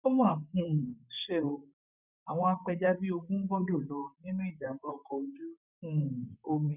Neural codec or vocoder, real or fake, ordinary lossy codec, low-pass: vocoder, 44.1 kHz, 128 mel bands, Pupu-Vocoder; fake; none; 3.6 kHz